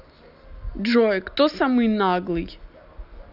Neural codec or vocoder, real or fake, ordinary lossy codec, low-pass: none; real; none; 5.4 kHz